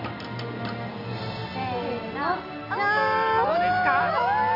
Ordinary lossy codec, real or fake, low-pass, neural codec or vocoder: none; real; 5.4 kHz; none